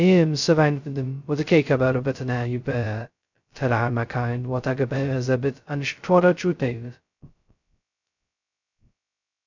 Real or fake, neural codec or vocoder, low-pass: fake; codec, 16 kHz, 0.2 kbps, FocalCodec; 7.2 kHz